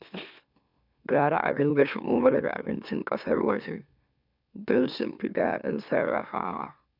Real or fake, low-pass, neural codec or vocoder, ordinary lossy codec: fake; 5.4 kHz; autoencoder, 44.1 kHz, a latent of 192 numbers a frame, MeloTTS; none